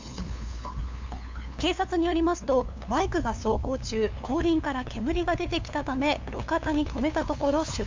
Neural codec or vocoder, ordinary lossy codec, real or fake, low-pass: codec, 16 kHz, 2 kbps, FunCodec, trained on LibriTTS, 25 frames a second; MP3, 64 kbps; fake; 7.2 kHz